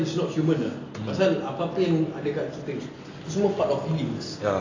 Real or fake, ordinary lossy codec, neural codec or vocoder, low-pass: real; AAC, 32 kbps; none; 7.2 kHz